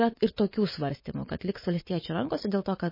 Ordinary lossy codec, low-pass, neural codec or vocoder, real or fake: MP3, 24 kbps; 5.4 kHz; none; real